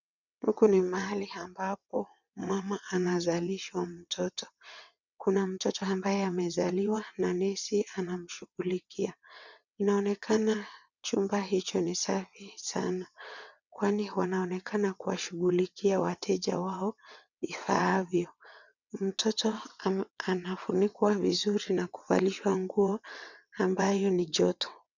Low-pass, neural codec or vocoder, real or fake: 7.2 kHz; vocoder, 22.05 kHz, 80 mel bands, WaveNeXt; fake